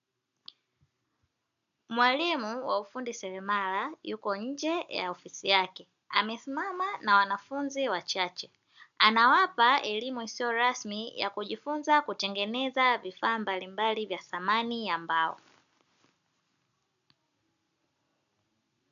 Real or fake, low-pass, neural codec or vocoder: real; 7.2 kHz; none